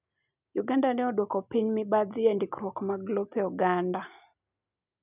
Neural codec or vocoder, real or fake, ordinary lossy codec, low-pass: none; real; none; 3.6 kHz